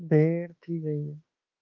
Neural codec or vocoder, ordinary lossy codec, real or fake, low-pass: autoencoder, 48 kHz, 32 numbers a frame, DAC-VAE, trained on Japanese speech; Opus, 32 kbps; fake; 7.2 kHz